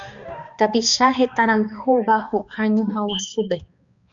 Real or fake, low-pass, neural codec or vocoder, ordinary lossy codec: fake; 7.2 kHz; codec, 16 kHz, 2 kbps, X-Codec, HuBERT features, trained on balanced general audio; Opus, 64 kbps